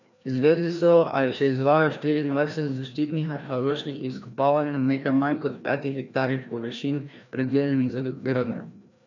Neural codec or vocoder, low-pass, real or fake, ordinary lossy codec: codec, 16 kHz, 1 kbps, FreqCodec, larger model; 7.2 kHz; fake; none